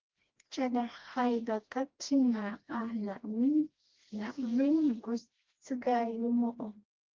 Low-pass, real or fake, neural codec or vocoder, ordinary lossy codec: 7.2 kHz; fake; codec, 16 kHz, 1 kbps, FreqCodec, smaller model; Opus, 32 kbps